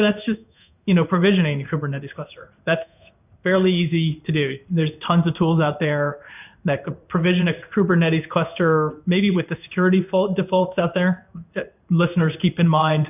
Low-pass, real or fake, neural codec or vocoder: 3.6 kHz; fake; codec, 16 kHz in and 24 kHz out, 1 kbps, XY-Tokenizer